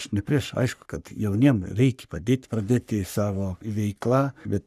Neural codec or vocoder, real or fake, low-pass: codec, 44.1 kHz, 3.4 kbps, Pupu-Codec; fake; 14.4 kHz